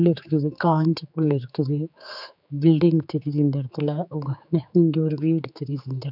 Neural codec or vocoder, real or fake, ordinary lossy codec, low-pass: codec, 16 kHz, 4 kbps, X-Codec, HuBERT features, trained on general audio; fake; none; 5.4 kHz